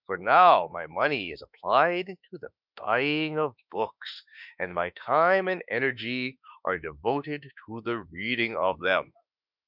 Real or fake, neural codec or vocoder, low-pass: fake; autoencoder, 48 kHz, 32 numbers a frame, DAC-VAE, trained on Japanese speech; 5.4 kHz